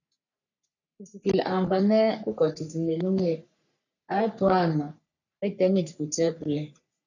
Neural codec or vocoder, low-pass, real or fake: codec, 44.1 kHz, 3.4 kbps, Pupu-Codec; 7.2 kHz; fake